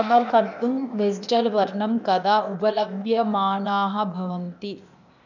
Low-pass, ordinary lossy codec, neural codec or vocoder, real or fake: 7.2 kHz; none; codec, 16 kHz, 0.8 kbps, ZipCodec; fake